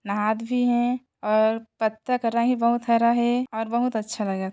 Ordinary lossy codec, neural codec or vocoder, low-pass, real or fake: none; none; none; real